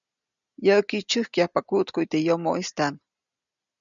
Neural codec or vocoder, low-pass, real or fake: none; 7.2 kHz; real